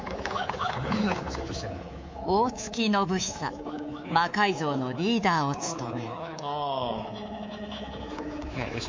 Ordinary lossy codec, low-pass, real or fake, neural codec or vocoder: MP3, 48 kbps; 7.2 kHz; fake; codec, 24 kHz, 3.1 kbps, DualCodec